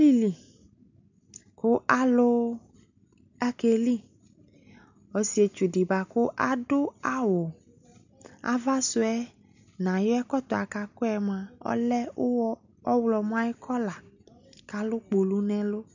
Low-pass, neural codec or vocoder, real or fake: 7.2 kHz; none; real